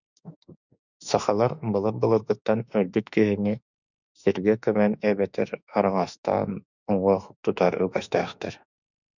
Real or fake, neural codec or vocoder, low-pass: fake; autoencoder, 48 kHz, 32 numbers a frame, DAC-VAE, trained on Japanese speech; 7.2 kHz